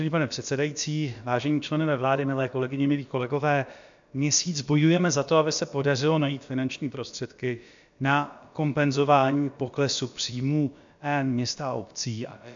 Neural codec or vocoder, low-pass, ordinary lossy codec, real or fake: codec, 16 kHz, about 1 kbps, DyCAST, with the encoder's durations; 7.2 kHz; MP3, 64 kbps; fake